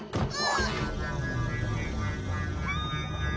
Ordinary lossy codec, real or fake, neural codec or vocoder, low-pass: none; real; none; none